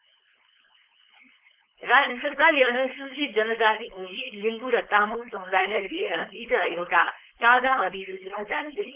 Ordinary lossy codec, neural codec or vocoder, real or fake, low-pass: Opus, 24 kbps; codec, 16 kHz, 4.8 kbps, FACodec; fake; 3.6 kHz